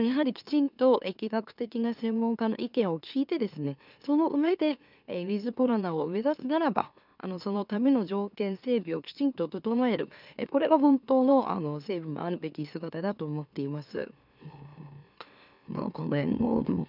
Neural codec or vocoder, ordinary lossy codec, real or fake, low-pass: autoencoder, 44.1 kHz, a latent of 192 numbers a frame, MeloTTS; none; fake; 5.4 kHz